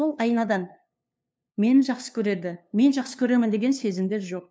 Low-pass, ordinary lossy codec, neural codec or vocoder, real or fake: none; none; codec, 16 kHz, 2 kbps, FunCodec, trained on LibriTTS, 25 frames a second; fake